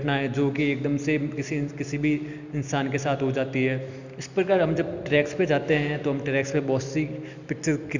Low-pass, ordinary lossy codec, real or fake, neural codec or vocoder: 7.2 kHz; none; real; none